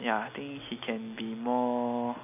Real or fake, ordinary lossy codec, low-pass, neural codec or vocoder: real; none; 3.6 kHz; none